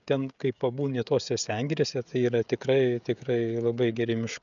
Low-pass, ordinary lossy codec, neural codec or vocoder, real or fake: 7.2 kHz; MP3, 96 kbps; codec, 16 kHz, 16 kbps, FreqCodec, smaller model; fake